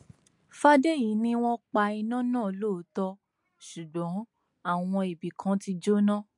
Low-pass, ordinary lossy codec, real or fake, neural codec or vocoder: 10.8 kHz; MP3, 64 kbps; real; none